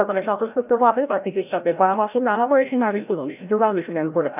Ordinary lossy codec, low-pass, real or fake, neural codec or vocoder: none; 3.6 kHz; fake; codec, 16 kHz, 0.5 kbps, FreqCodec, larger model